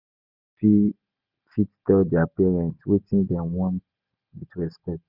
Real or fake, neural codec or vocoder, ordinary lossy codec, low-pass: real; none; Opus, 64 kbps; 5.4 kHz